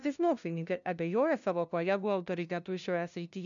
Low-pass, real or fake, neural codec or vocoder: 7.2 kHz; fake; codec, 16 kHz, 0.5 kbps, FunCodec, trained on LibriTTS, 25 frames a second